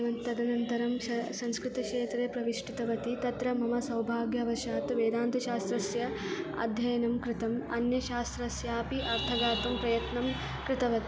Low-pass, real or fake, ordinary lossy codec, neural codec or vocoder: none; real; none; none